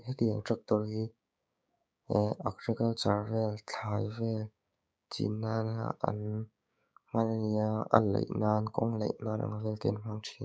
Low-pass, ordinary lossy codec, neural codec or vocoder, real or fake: none; none; codec, 16 kHz, 6 kbps, DAC; fake